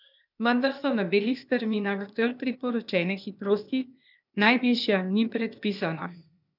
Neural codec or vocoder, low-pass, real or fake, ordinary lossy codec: codec, 16 kHz, 0.8 kbps, ZipCodec; 5.4 kHz; fake; none